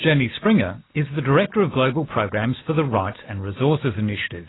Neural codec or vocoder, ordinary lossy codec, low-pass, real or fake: none; AAC, 16 kbps; 7.2 kHz; real